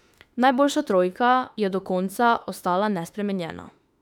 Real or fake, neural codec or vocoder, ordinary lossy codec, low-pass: fake; autoencoder, 48 kHz, 32 numbers a frame, DAC-VAE, trained on Japanese speech; none; 19.8 kHz